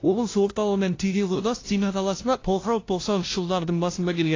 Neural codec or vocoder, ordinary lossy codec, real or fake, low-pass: codec, 16 kHz, 0.5 kbps, FunCodec, trained on LibriTTS, 25 frames a second; AAC, 32 kbps; fake; 7.2 kHz